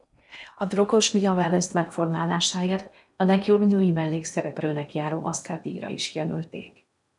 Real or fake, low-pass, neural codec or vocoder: fake; 10.8 kHz; codec, 16 kHz in and 24 kHz out, 0.8 kbps, FocalCodec, streaming, 65536 codes